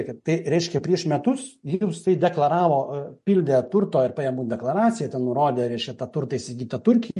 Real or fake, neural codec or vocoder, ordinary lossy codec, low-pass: real; none; MP3, 48 kbps; 14.4 kHz